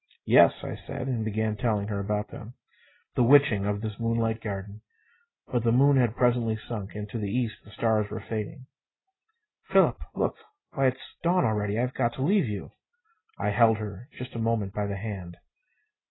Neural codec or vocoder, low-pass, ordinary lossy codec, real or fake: none; 7.2 kHz; AAC, 16 kbps; real